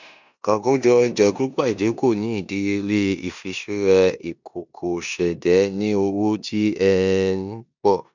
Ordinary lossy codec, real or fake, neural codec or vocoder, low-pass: none; fake; codec, 16 kHz in and 24 kHz out, 0.9 kbps, LongCat-Audio-Codec, four codebook decoder; 7.2 kHz